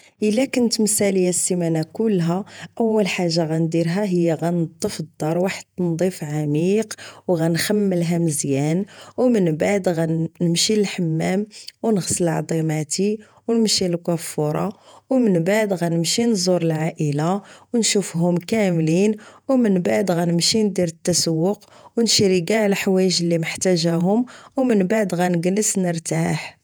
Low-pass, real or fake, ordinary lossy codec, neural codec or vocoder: none; fake; none; vocoder, 48 kHz, 128 mel bands, Vocos